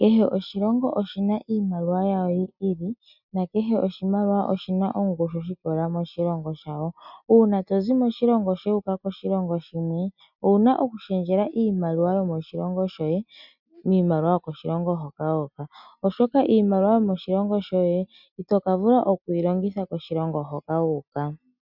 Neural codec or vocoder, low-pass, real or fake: none; 5.4 kHz; real